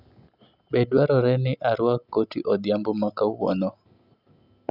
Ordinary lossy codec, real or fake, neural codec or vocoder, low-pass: Opus, 64 kbps; real; none; 5.4 kHz